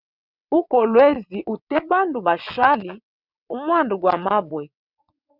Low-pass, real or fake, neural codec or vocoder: 5.4 kHz; fake; vocoder, 22.05 kHz, 80 mel bands, WaveNeXt